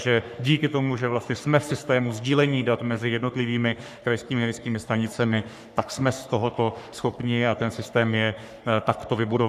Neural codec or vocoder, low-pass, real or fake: codec, 44.1 kHz, 3.4 kbps, Pupu-Codec; 14.4 kHz; fake